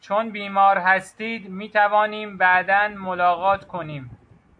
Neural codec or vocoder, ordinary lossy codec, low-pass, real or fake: none; AAC, 64 kbps; 9.9 kHz; real